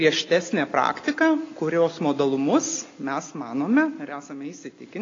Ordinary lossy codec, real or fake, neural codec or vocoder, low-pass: AAC, 32 kbps; real; none; 7.2 kHz